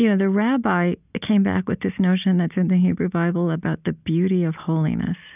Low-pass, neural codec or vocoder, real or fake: 3.6 kHz; none; real